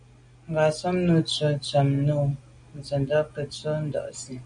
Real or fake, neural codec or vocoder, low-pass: real; none; 9.9 kHz